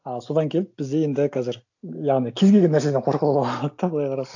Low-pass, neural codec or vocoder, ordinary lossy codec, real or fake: 7.2 kHz; none; AAC, 48 kbps; real